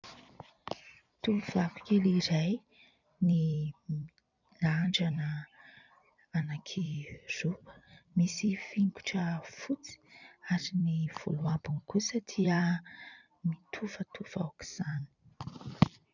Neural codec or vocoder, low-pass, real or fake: vocoder, 44.1 kHz, 128 mel bands every 256 samples, BigVGAN v2; 7.2 kHz; fake